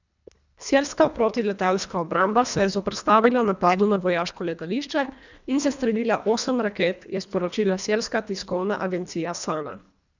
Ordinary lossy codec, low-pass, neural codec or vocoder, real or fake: none; 7.2 kHz; codec, 24 kHz, 1.5 kbps, HILCodec; fake